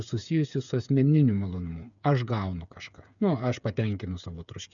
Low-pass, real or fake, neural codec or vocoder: 7.2 kHz; fake; codec, 16 kHz, 8 kbps, FreqCodec, smaller model